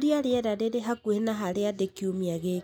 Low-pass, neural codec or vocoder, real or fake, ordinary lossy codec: 19.8 kHz; none; real; none